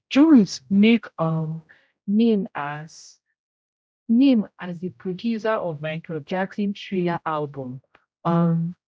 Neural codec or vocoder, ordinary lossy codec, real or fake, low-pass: codec, 16 kHz, 0.5 kbps, X-Codec, HuBERT features, trained on general audio; none; fake; none